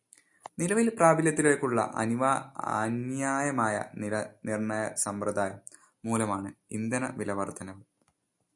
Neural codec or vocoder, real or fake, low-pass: none; real; 10.8 kHz